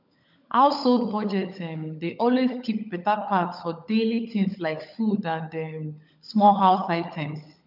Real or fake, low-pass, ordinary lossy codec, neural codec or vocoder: fake; 5.4 kHz; none; codec, 16 kHz, 16 kbps, FunCodec, trained on LibriTTS, 50 frames a second